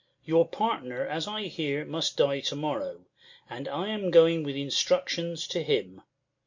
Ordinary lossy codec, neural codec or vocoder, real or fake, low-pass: MP3, 48 kbps; none; real; 7.2 kHz